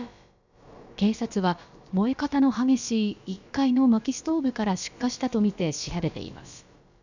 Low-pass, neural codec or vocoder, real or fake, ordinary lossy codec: 7.2 kHz; codec, 16 kHz, about 1 kbps, DyCAST, with the encoder's durations; fake; none